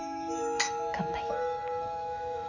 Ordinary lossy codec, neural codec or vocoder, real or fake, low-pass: none; autoencoder, 48 kHz, 128 numbers a frame, DAC-VAE, trained on Japanese speech; fake; 7.2 kHz